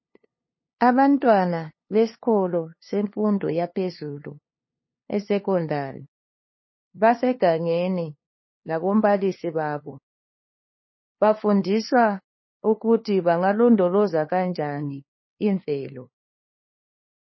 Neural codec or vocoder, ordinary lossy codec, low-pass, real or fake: codec, 16 kHz, 2 kbps, FunCodec, trained on LibriTTS, 25 frames a second; MP3, 24 kbps; 7.2 kHz; fake